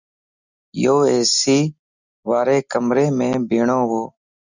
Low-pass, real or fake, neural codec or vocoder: 7.2 kHz; real; none